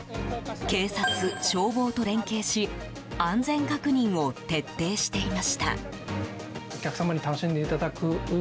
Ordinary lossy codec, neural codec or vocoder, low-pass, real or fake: none; none; none; real